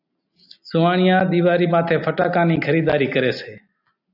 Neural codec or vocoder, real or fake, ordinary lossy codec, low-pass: none; real; AAC, 48 kbps; 5.4 kHz